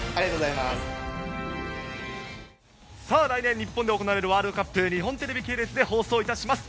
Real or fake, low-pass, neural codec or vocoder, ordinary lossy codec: real; none; none; none